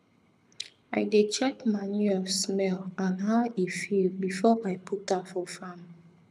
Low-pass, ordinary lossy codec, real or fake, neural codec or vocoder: none; none; fake; codec, 24 kHz, 6 kbps, HILCodec